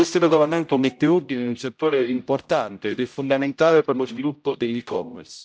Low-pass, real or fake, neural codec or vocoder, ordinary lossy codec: none; fake; codec, 16 kHz, 0.5 kbps, X-Codec, HuBERT features, trained on general audio; none